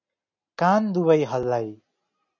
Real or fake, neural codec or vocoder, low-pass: real; none; 7.2 kHz